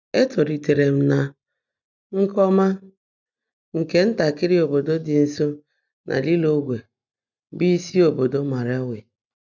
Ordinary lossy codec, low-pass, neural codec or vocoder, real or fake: none; 7.2 kHz; none; real